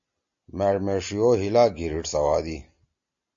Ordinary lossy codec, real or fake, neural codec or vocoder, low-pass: MP3, 48 kbps; real; none; 7.2 kHz